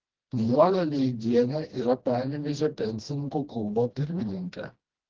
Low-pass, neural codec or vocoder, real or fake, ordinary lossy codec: 7.2 kHz; codec, 16 kHz, 1 kbps, FreqCodec, smaller model; fake; Opus, 16 kbps